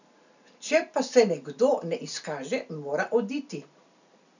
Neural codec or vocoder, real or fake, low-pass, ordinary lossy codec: none; real; 7.2 kHz; none